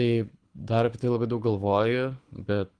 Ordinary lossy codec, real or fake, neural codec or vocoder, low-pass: Opus, 24 kbps; fake; codec, 24 kHz, 6 kbps, HILCodec; 9.9 kHz